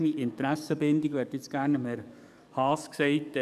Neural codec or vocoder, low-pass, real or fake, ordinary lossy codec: codec, 44.1 kHz, 7.8 kbps, DAC; 14.4 kHz; fake; none